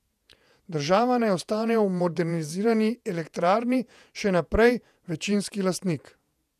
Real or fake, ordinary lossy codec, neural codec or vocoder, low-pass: fake; none; vocoder, 48 kHz, 128 mel bands, Vocos; 14.4 kHz